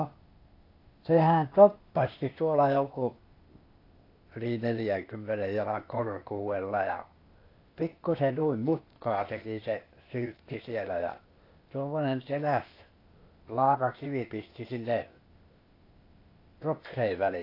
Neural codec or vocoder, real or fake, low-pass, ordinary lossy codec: codec, 16 kHz, 0.8 kbps, ZipCodec; fake; 5.4 kHz; AAC, 32 kbps